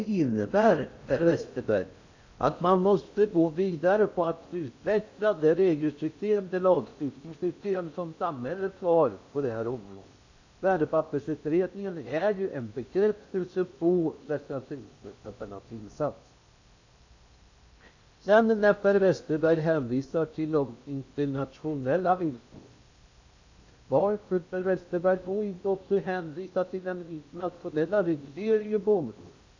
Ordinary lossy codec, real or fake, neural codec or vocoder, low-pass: AAC, 48 kbps; fake; codec, 16 kHz in and 24 kHz out, 0.6 kbps, FocalCodec, streaming, 4096 codes; 7.2 kHz